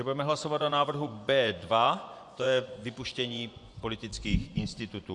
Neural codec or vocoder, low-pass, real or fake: vocoder, 24 kHz, 100 mel bands, Vocos; 10.8 kHz; fake